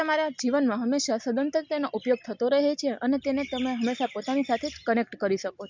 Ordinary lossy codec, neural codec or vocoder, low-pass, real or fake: MP3, 64 kbps; none; 7.2 kHz; real